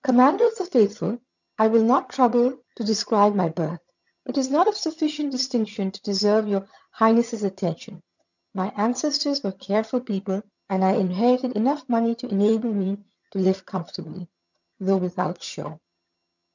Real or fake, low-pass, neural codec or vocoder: fake; 7.2 kHz; vocoder, 22.05 kHz, 80 mel bands, HiFi-GAN